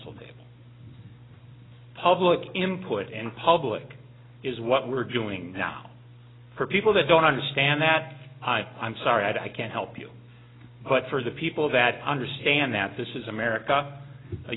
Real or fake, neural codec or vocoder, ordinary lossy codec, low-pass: real; none; AAC, 16 kbps; 7.2 kHz